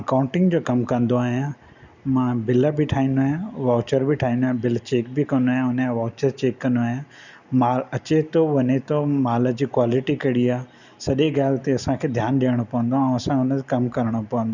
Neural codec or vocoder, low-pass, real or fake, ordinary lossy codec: none; 7.2 kHz; real; none